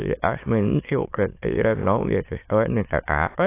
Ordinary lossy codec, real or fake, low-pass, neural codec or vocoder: AAC, 24 kbps; fake; 3.6 kHz; autoencoder, 22.05 kHz, a latent of 192 numbers a frame, VITS, trained on many speakers